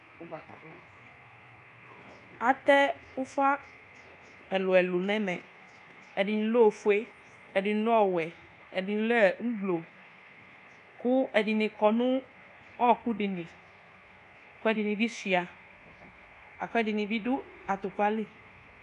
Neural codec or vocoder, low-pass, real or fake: codec, 24 kHz, 1.2 kbps, DualCodec; 10.8 kHz; fake